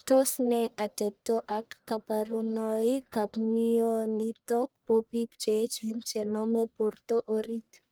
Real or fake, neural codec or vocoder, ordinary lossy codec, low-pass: fake; codec, 44.1 kHz, 1.7 kbps, Pupu-Codec; none; none